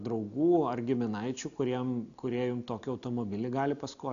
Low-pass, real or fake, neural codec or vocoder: 7.2 kHz; real; none